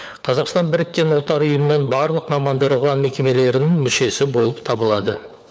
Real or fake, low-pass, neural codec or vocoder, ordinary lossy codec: fake; none; codec, 16 kHz, 4 kbps, FunCodec, trained on LibriTTS, 50 frames a second; none